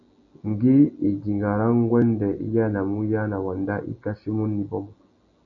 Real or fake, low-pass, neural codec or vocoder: real; 7.2 kHz; none